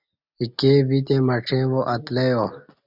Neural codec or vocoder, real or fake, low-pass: none; real; 5.4 kHz